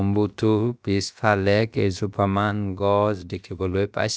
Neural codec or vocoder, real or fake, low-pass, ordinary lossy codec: codec, 16 kHz, about 1 kbps, DyCAST, with the encoder's durations; fake; none; none